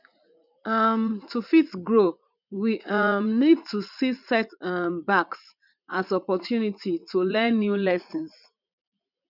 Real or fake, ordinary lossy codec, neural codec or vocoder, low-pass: fake; none; vocoder, 44.1 kHz, 80 mel bands, Vocos; 5.4 kHz